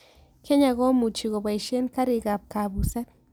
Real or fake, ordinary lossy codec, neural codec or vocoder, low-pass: real; none; none; none